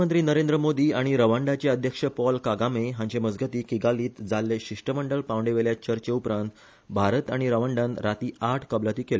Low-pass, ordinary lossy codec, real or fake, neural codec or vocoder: none; none; real; none